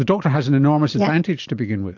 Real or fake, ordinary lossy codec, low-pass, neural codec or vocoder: fake; AAC, 48 kbps; 7.2 kHz; vocoder, 44.1 kHz, 128 mel bands every 512 samples, BigVGAN v2